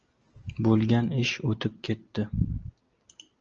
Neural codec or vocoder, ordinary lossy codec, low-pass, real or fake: none; Opus, 24 kbps; 7.2 kHz; real